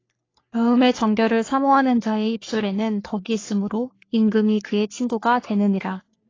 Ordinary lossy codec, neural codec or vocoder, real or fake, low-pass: AAC, 32 kbps; codec, 44.1 kHz, 3.4 kbps, Pupu-Codec; fake; 7.2 kHz